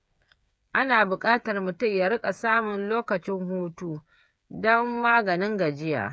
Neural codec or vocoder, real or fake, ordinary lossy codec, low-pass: codec, 16 kHz, 8 kbps, FreqCodec, smaller model; fake; none; none